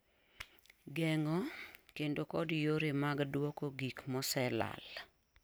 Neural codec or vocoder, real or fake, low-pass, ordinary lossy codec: none; real; none; none